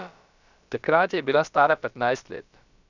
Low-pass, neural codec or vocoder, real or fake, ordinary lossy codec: 7.2 kHz; codec, 16 kHz, about 1 kbps, DyCAST, with the encoder's durations; fake; none